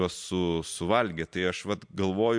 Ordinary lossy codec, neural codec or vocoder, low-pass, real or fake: MP3, 64 kbps; none; 9.9 kHz; real